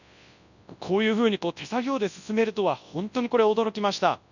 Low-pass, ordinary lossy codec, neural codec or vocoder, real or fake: 7.2 kHz; none; codec, 24 kHz, 0.9 kbps, WavTokenizer, large speech release; fake